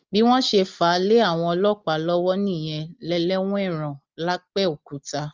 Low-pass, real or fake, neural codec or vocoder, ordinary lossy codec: 7.2 kHz; real; none; Opus, 24 kbps